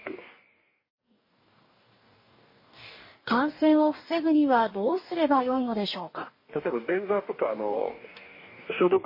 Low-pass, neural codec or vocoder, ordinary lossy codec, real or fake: 5.4 kHz; codec, 44.1 kHz, 2.6 kbps, DAC; MP3, 24 kbps; fake